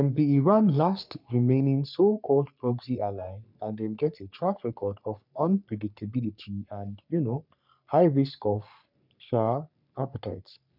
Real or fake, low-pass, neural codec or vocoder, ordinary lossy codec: fake; 5.4 kHz; codec, 44.1 kHz, 3.4 kbps, Pupu-Codec; none